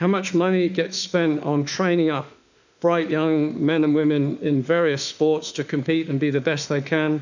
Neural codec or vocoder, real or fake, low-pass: autoencoder, 48 kHz, 32 numbers a frame, DAC-VAE, trained on Japanese speech; fake; 7.2 kHz